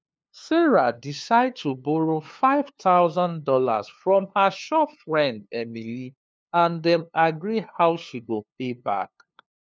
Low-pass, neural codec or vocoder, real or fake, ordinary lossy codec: none; codec, 16 kHz, 2 kbps, FunCodec, trained on LibriTTS, 25 frames a second; fake; none